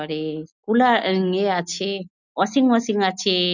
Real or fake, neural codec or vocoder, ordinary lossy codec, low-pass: real; none; none; 7.2 kHz